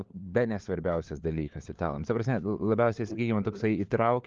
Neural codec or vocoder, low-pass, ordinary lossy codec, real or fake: codec, 16 kHz, 4 kbps, FunCodec, trained on LibriTTS, 50 frames a second; 7.2 kHz; Opus, 32 kbps; fake